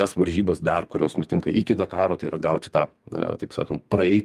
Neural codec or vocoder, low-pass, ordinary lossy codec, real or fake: codec, 32 kHz, 1.9 kbps, SNAC; 14.4 kHz; Opus, 32 kbps; fake